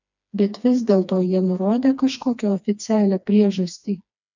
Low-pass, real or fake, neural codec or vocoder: 7.2 kHz; fake; codec, 16 kHz, 2 kbps, FreqCodec, smaller model